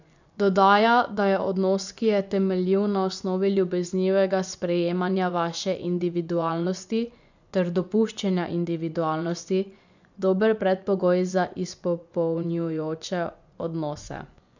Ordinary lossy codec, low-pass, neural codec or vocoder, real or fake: none; 7.2 kHz; vocoder, 24 kHz, 100 mel bands, Vocos; fake